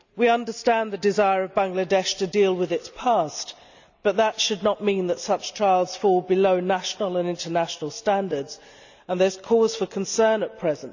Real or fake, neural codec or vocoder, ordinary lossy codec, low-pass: real; none; none; 7.2 kHz